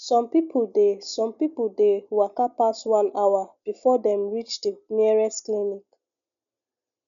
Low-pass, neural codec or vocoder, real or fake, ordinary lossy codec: 7.2 kHz; none; real; none